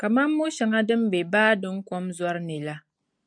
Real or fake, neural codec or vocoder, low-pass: real; none; 9.9 kHz